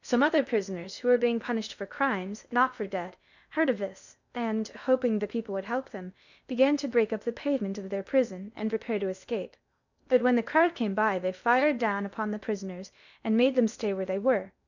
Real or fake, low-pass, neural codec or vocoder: fake; 7.2 kHz; codec, 16 kHz in and 24 kHz out, 0.6 kbps, FocalCodec, streaming, 2048 codes